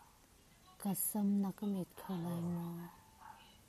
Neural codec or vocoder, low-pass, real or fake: none; 14.4 kHz; real